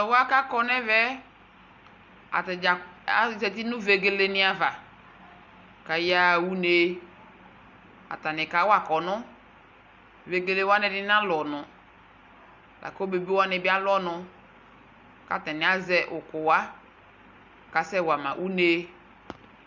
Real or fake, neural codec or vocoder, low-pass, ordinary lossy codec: real; none; 7.2 kHz; MP3, 64 kbps